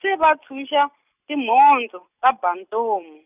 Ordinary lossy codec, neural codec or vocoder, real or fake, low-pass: none; none; real; 3.6 kHz